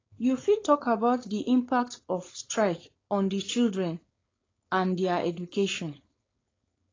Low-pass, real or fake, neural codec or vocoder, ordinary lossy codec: 7.2 kHz; fake; codec, 16 kHz, 4.8 kbps, FACodec; AAC, 32 kbps